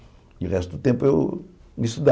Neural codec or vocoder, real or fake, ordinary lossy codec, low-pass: none; real; none; none